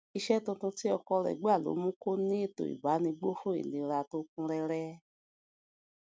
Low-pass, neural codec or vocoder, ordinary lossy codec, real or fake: none; none; none; real